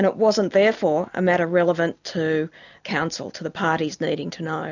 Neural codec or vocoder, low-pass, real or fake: none; 7.2 kHz; real